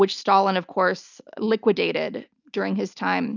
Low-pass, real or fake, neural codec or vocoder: 7.2 kHz; real; none